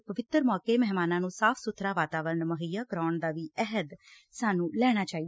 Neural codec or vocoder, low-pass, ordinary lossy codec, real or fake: none; none; none; real